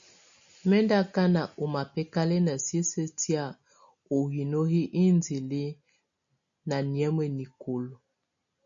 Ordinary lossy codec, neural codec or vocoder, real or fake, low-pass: MP3, 64 kbps; none; real; 7.2 kHz